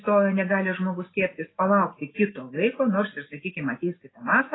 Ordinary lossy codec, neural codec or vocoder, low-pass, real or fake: AAC, 16 kbps; none; 7.2 kHz; real